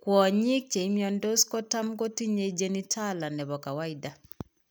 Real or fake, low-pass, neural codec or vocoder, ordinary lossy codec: real; none; none; none